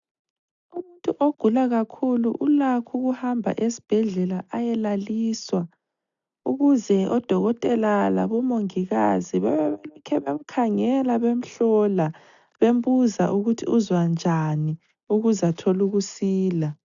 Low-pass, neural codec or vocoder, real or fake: 7.2 kHz; none; real